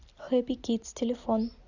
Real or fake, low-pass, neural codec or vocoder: real; 7.2 kHz; none